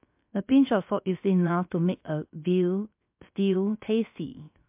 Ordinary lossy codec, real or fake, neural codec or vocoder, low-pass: MP3, 32 kbps; fake; codec, 16 kHz, 0.3 kbps, FocalCodec; 3.6 kHz